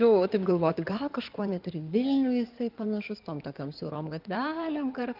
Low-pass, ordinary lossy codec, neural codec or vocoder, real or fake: 5.4 kHz; Opus, 24 kbps; codec, 16 kHz in and 24 kHz out, 2.2 kbps, FireRedTTS-2 codec; fake